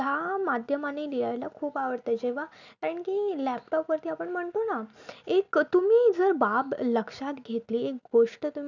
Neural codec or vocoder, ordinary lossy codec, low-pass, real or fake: none; none; 7.2 kHz; real